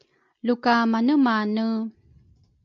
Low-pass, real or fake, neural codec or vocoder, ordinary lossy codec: 7.2 kHz; real; none; MP3, 48 kbps